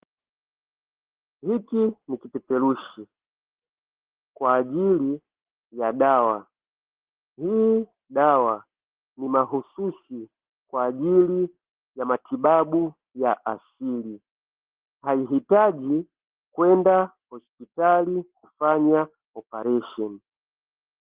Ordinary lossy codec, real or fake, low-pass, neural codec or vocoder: Opus, 16 kbps; real; 3.6 kHz; none